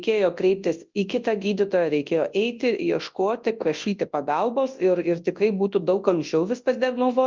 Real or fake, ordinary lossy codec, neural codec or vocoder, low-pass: fake; Opus, 32 kbps; codec, 24 kHz, 0.9 kbps, WavTokenizer, large speech release; 7.2 kHz